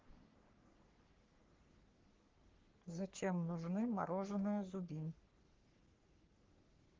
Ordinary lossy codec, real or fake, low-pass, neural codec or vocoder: Opus, 16 kbps; fake; 7.2 kHz; codec, 44.1 kHz, 3.4 kbps, Pupu-Codec